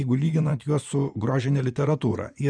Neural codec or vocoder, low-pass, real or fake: vocoder, 24 kHz, 100 mel bands, Vocos; 9.9 kHz; fake